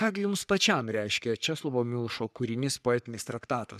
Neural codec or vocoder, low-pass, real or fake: codec, 44.1 kHz, 3.4 kbps, Pupu-Codec; 14.4 kHz; fake